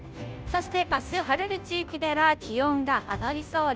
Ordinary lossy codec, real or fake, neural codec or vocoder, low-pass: none; fake; codec, 16 kHz, 0.5 kbps, FunCodec, trained on Chinese and English, 25 frames a second; none